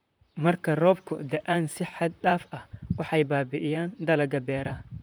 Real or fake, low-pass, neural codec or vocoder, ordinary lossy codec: fake; none; vocoder, 44.1 kHz, 128 mel bands, Pupu-Vocoder; none